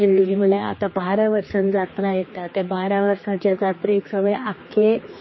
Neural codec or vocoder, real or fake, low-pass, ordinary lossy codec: codec, 16 kHz, 2 kbps, X-Codec, HuBERT features, trained on general audio; fake; 7.2 kHz; MP3, 24 kbps